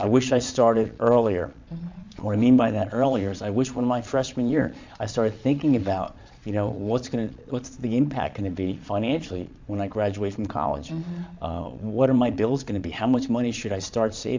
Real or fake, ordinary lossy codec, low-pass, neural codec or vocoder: fake; MP3, 64 kbps; 7.2 kHz; vocoder, 22.05 kHz, 80 mel bands, Vocos